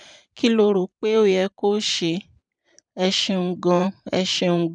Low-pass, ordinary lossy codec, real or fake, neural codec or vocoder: 9.9 kHz; none; fake; vocoder, 44.1 kHz, 128 mel bands every 512 samples, BigVGAN v2